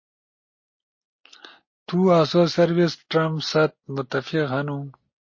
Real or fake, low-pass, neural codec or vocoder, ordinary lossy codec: real; 7.2 kHz; none; MP3, 32 kbps